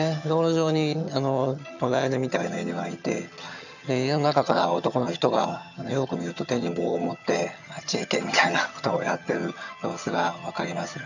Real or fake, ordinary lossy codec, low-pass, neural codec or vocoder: fake; none; 7.2 kHz; vocoder, 22.05 kHz, 80 mel bands, HiFi-GAN